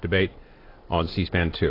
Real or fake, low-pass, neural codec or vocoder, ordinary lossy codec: real; 5.4 kHz; none; AAC, 24 kbps